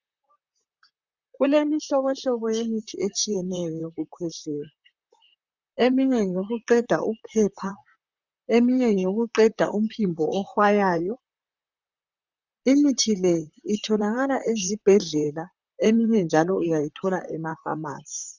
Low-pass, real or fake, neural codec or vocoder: 7.2 kHz; fake; vocoder, 44.1 kHz, 128 mel bands, Pupu-Vocoder